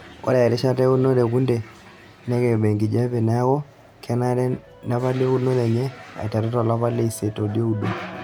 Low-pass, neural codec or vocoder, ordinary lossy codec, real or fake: 19.8 kHz; none; none; real